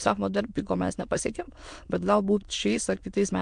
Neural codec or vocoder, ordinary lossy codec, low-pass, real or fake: autoencoder, 22.05 kHz, a latent of 192 numbers a frame, VITS, trained on many speakers; MP3, 64 kbps; 9.9 kHz; fake